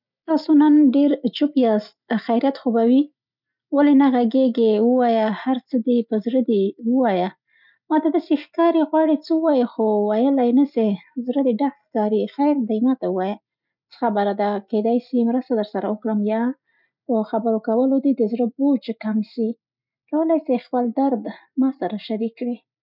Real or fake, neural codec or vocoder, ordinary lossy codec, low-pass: real; none; none; 5.4 kHz